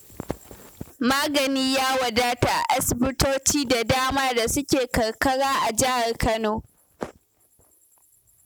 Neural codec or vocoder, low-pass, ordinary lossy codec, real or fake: none; none; none; real